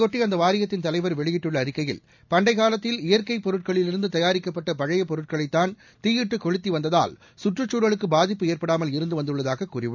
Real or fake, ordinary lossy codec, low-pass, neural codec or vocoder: real; none; 7.2 kHz; none